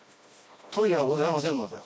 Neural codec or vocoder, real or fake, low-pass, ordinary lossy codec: codec, 16 kHz, 1 kbps, FreqCodec, smaller model; fake; none; none